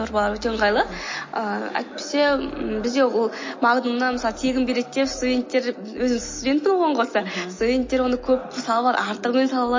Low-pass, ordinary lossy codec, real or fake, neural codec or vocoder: 7.2 kHz; MP3, 32 kbps; real; none